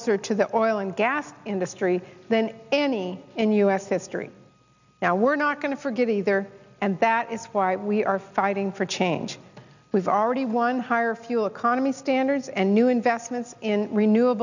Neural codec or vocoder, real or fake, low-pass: none; real; 7.2 kHz